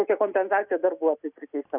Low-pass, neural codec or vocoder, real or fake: 3.6 kHz; none; real